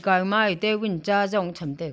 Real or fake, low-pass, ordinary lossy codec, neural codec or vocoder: real; none; none; none